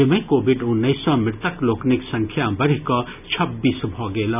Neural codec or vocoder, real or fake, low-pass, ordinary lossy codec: none; real; 3.6 kHz; none